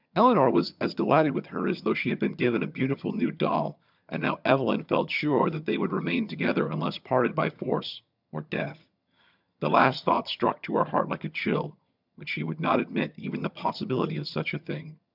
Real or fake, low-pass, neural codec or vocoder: fake; 5.4 kHz; vocoder, 22.05 kHz, 80 mel bands, HiFi-GAN